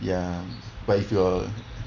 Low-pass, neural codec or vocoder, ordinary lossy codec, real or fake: 7.2 kHz; none; Opus, 64 kbps; real